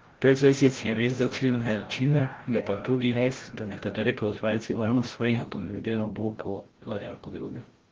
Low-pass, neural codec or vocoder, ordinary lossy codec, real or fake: 7.2 kHz; codec, 16 kHz, 0.5 kbps, FreqCodec, larger model; Opus, 16 kbps; fake